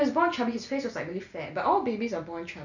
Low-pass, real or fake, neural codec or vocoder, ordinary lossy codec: 7.2 kHz; real; none; none